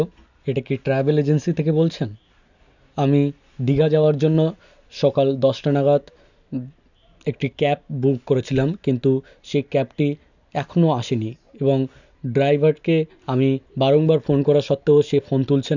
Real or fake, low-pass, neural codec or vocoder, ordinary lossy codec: real; 7.2 kHz; none; none